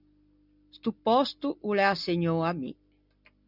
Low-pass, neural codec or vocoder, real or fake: 5.4 kHz; none; real